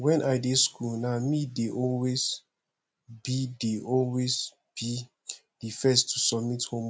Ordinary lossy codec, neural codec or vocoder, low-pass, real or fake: none; none; none; real